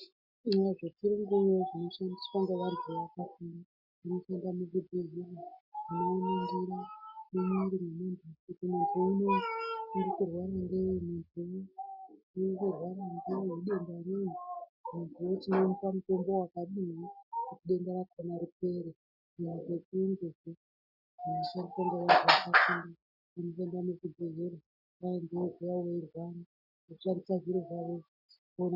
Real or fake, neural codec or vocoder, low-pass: real; none; 5.4 kHz